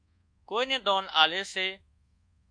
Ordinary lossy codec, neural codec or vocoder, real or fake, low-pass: AAC, 64 kbps; codec, 24 kHz, 1.2 kbps, DualCodec; fake; 9.9 kHz